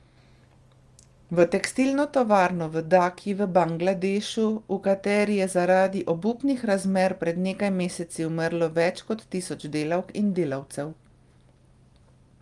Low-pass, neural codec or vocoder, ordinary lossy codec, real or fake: 10.8 kHz; none; Opus, 32 kbps; real